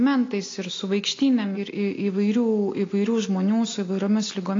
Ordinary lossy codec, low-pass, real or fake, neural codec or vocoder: AAC, 32 kbps; 7.2 kHz; real; none